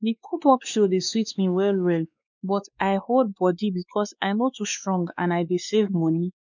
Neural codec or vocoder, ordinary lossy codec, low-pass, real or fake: codec, 16 kHz, 2 kbps, X-Codec, WavLM features, trained on Multilingual LibriSpeech; none; 7.2 kHz; fake